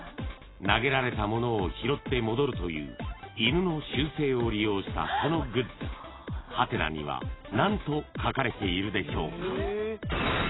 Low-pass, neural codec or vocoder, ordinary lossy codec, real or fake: 7.2 kHz; none; AAC, 16 kbps; real